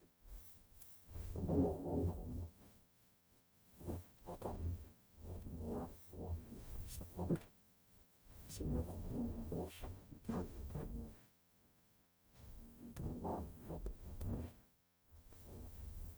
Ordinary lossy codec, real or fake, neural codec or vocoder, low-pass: none; fake; codec, 44.1 kHz, 0.9 kbps, DAC; none